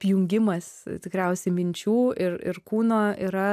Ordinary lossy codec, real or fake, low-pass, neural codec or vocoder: AAC, 96 kbps; real; 14.4 kHz; none